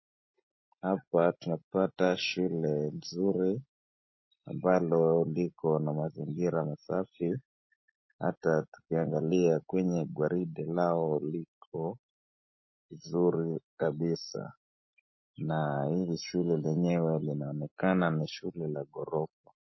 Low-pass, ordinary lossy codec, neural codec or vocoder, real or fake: 7.2 kHz; MP3, 24 kbps; none; real